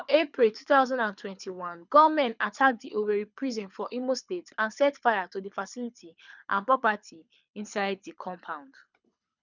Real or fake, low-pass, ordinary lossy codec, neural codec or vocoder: fake; 7.2 kHz; none; codec, 24 kHz, 6 kbps, HILCodec